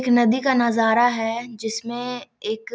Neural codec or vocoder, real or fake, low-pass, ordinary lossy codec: none; real; none; none